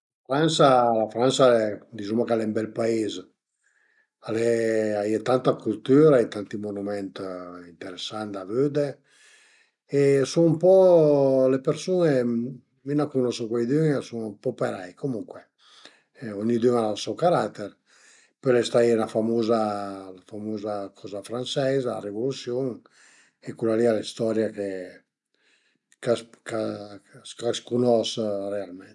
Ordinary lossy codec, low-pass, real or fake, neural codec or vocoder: none; 10.8 kHz; real; none